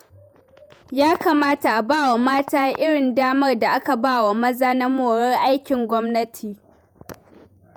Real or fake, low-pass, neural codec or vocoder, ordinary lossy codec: fake; none; vocoder, 48 kHz, 128 mel bands, Vocos; none